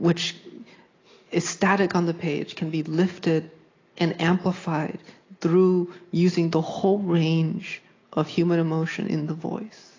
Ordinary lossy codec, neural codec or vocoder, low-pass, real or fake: AAC, 32 kbps; none; 7.2 kHz; real